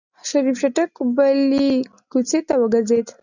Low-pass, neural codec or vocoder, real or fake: 7.2 kHz; none; real